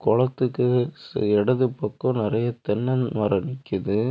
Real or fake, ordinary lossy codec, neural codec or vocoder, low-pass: real; none; none; none